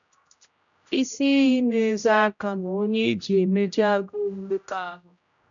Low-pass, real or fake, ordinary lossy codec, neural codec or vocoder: 7.2 kHz; fake; none; codec, 16 kHz, 0.5 kbps, X-Codec, HuBERT features, trained on general audio